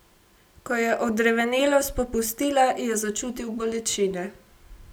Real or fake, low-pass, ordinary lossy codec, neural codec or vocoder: real; none; none; none